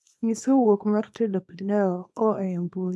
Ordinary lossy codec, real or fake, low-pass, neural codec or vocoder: none; fake; none; codec, 24 kHz, 0.9 kbps, WavTokenizer, small release